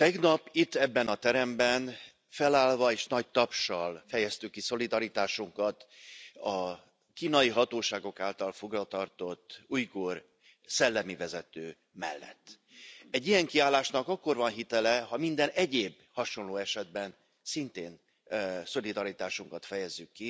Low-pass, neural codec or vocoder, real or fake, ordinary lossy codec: none; none; real; none